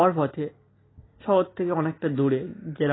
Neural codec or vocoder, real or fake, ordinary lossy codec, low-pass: none; real; AAC, 16 kbps; 7.2 kHz